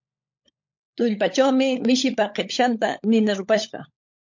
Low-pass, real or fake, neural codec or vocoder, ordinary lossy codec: 7.2 kHz; fake; codec, 16 kHz, 16 kbps, FunCodec, trained on LibriTTS, 50 frames a second; MP3, 48 kbps